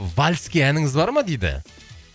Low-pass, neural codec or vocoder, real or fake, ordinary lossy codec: none; none; real; none